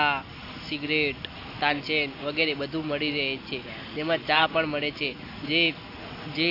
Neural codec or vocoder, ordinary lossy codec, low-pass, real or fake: none; AAC, 32 kbps; 5.4 kHz; real